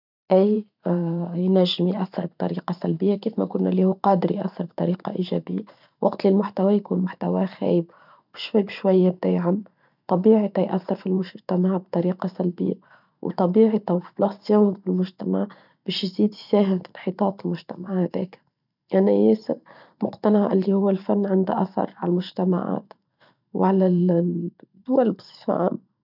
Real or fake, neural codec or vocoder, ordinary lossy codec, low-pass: fake; vocoder, 44.1 kHz, 128 mel bands every 512 samples, BigVGAN v2; none; 5.4 kHz